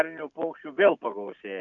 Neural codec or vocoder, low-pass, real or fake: none; 7.2 kHz; real